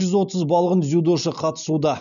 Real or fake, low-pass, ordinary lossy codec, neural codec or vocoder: real; 7.2 kHz; MP3, 96 kbps; none